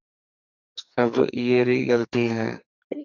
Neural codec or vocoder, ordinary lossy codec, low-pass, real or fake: codec, 44.1 kHz, 2.6 kbps, SNAC; Opus, 64 kbps; 7.2 kHz; fake